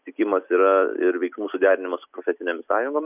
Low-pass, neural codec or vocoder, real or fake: 3.6 kHz; none; real